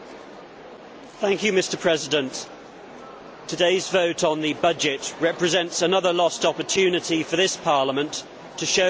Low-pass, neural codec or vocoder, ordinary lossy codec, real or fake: none; none; none; real